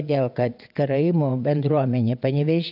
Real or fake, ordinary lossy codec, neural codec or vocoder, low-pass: fake; AAC, 48 kbps; vocoder, 22.05 kHz, 80 mel bands, WaveNeXt; 5.4 kHz